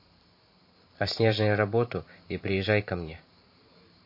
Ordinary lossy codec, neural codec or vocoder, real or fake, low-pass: MP3, 32 kbps; vocoder, 44.1 kHz, 128 mel bands every 512 samples, BigVGAN v2; fake; 5.4 kHz